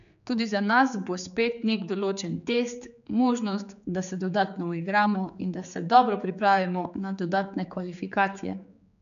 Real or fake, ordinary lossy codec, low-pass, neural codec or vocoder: fake; none; 7.2 kHz; codec, 16 kHz, 4 kbps, X-Codec, HuBERT features, trained on general audio